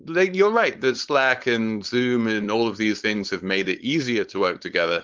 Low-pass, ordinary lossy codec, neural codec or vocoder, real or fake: 7.2 kHz; Opus, 32 kbps; codec, 16 kHz, 4.8 kbps, FACodec; fake